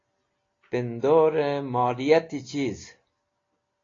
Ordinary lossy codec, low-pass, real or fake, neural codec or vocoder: AAC, 32 kbps; 7.2 kHz; real; none